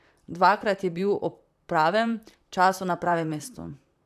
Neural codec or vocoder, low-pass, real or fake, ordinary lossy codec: vocoder, 44.1 kHz, 128 mel bands, Pupu-Vocoder; 14.4 kHz; fake; none